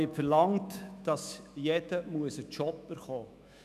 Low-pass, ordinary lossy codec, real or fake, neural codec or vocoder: 14.4 kHz; none; fake; autoencoder, 48 kHz, 128 numbers a frame, DAC-VAE, trained on Japanese speech